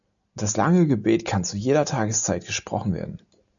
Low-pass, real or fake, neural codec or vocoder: 7.2 kHz; real; none